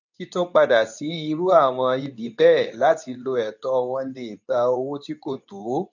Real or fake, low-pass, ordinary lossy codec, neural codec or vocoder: fake; 7.2 kHz; none; codec, 24 kHz, 0.9 kbps, WavTokenizer, medium speech release version 2